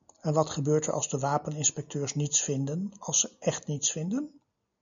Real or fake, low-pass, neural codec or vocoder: real; 7.2 kHz; none